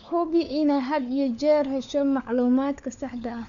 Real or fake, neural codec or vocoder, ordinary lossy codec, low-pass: fake; codec, 16 kHz, 4 kbps, FunCodec, trained on LibriTTS, 50 frames a second; none; 7.2 kHz